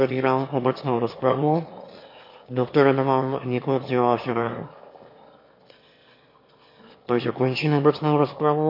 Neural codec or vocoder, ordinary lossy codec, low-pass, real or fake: autoencoder, 22.05 kHz, a latent of 192 numbers a frame, VITS, trained on one speaker; MP3, 32 kbps; 5.4 kHz; fake